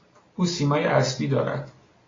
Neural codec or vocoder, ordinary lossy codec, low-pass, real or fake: none; AAC, 32 kbps; 7.2 kHz; real